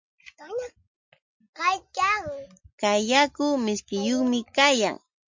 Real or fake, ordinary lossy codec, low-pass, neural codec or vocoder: real; MP3, 48 kbps; 7.2 kHz; none